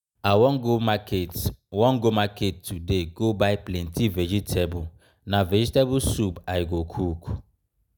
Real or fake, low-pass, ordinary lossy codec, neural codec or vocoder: real; none; none; none